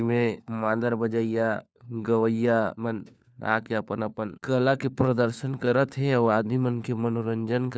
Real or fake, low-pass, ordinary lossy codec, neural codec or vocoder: fake; none; none; codec, 16 kHz, 4 kbps, FunCodec, trained on LibriTTS, 50 frames a second